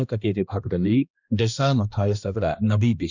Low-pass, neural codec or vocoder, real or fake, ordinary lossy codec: 7.2 kHz; codec, 16 kHz, 1 kbps, X-Codec, HuBERT features, trained on balanced general audio; fake; none